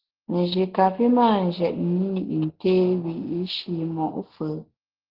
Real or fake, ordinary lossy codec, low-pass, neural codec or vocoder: real; Opus, 16 kbps; 5.4 kHz; none